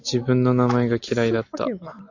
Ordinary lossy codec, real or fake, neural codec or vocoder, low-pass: AAC, 48 kbps; real; none; 7.2 kHz